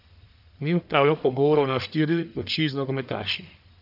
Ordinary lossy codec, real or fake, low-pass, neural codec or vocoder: none; fake; 5.4 kHz; codec, 44.1 kHz, 1.7 kbps, Pupu-Codec